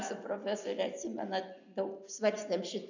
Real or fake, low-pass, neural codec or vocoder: real; 7.2 kHz; none